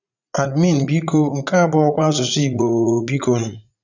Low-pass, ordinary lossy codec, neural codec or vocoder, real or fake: 7.2 kHz; none; vocoder, 44.1 kHz, 80 mel bands, Vocos; fake